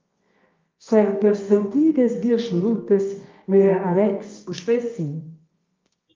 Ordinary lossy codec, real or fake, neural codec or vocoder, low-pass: Opus, 32 kbps; fake; codec, 24 kHz, 0.9 kbps, WavTokenizer, medium music audio release; 7.2 kHz